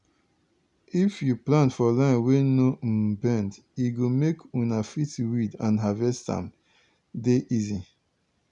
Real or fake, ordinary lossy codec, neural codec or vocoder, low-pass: real; none; none; 10.8 kHz